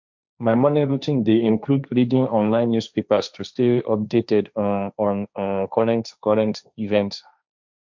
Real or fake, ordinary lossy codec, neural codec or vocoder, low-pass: fake; MP3, 64 kbps; codec, 16 kHz, 1.1 kbps, Voila-Tokenizer; 7.2 kHz